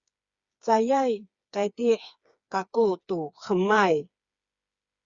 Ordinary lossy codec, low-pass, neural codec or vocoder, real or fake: Opus, 64 kbps; 7.2 kHz; codec, 16 kHz, 4 kbps, FreqCodec, smaller model; fake